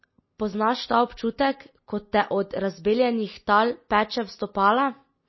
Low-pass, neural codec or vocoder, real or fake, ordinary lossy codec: 7.2 kHz; none; real; MP3, 24 kbps